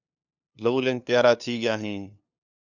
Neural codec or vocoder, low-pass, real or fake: codec, 16 kHz, 2 kbps, FunCodec, trained on LibriTTS, 25 frames a second; 7.2 kHz; fake